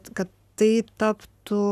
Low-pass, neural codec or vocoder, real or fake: 14.4 kHz; codec, 44.1 kHz, 7.8 kbps, Pupu-Codec; fake